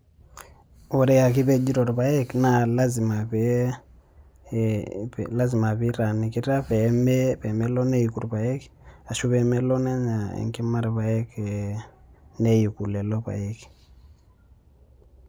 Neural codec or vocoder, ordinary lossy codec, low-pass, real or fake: none; none; none; real